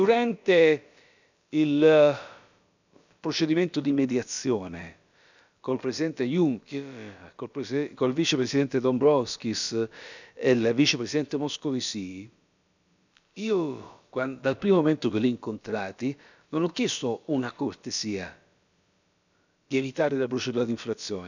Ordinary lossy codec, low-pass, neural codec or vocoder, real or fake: none; 7.2 kHz; codec, 16 kHz, about 1 kbps, DyCAST, with the encoder's durations; fake